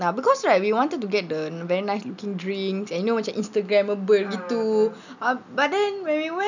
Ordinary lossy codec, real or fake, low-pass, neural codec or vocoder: none; real; 7.2 kHz; none